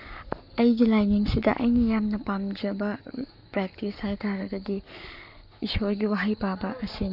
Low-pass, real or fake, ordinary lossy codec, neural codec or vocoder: 5.4 kHz; fake; none; codec, 44.1 kHz, 7.8 kbps, Pupu-Codec